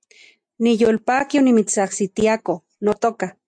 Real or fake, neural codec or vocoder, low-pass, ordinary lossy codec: real; none; 9.9 kHz; MP3, 64 kbps